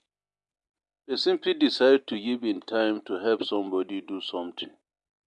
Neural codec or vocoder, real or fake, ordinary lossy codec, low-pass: none; real; MP3, 64 kbps; 10.8 kHz